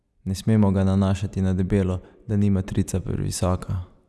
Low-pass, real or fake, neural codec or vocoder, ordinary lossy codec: none; real; none; none